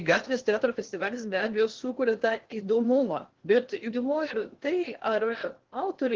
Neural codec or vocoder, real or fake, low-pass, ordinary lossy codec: codec, 16 kHz in and 24 kHz out, 0.8 kbps, FocalCodec, streaming, 65536 codes; fake; 7.2 kHz; Opus, 16 kbps